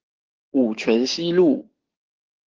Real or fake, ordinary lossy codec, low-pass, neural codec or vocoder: fake; Opus, 16 kbps; 7.2 kHz; codec, 16 kHz, 6 kbps, DAC